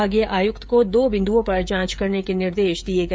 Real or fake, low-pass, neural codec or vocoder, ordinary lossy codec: fake; none; codec, 16 kHz, 16 kbps, FreqCodec, smaller model; none